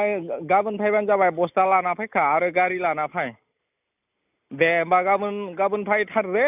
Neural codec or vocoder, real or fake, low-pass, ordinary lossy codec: none; real; 3.6 kHz; none